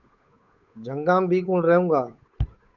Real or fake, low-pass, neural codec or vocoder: fake; 7.2 kHz; codec, 16 kHz, 8 kbps, FunCodec, trained on Chinese and English, 25 frames a second